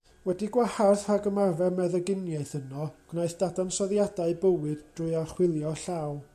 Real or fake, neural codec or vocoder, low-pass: real; none; 14.4 kHz